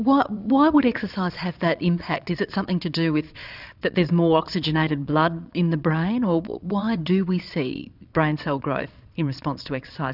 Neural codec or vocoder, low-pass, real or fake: vocoder, 22.05 kHz, 80 mel bands, Vocos; 5.4 kHz; fake